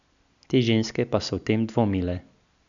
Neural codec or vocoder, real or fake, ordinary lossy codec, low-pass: none; real; none; 7.2 kHz